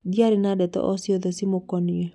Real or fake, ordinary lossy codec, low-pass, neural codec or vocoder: real; none; 10.8 kHz; none